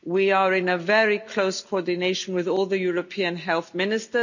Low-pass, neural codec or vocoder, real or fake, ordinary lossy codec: 7.2 kHz; none; real; none